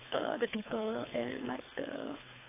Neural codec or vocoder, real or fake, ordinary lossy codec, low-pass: codec, 24 kHz, 3 kbps, HILCodec; fake; AAC, 16 kbps; 3.6 kHz